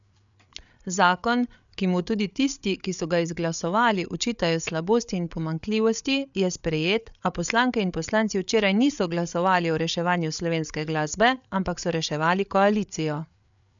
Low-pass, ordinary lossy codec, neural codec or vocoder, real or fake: 7.2 kHz; none; codec, 16 kHz, 8 kbps, FreqCodec, larger model; fake